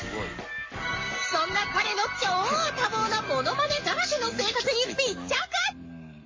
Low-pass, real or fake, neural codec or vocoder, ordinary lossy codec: 7.2 kHz; fake; codec, 44.1 kHz, 7.8 kbps, Pupu-Codec; MP3, 32 kbps